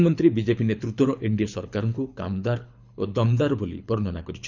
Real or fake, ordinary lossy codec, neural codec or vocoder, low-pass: fake; none; codec, 24 kHz, 6 kbps, HILCodec; 7.2 kHz